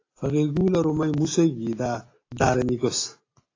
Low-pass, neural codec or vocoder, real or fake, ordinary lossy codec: 7.2 kHz; none; real; AAC, 32 kbps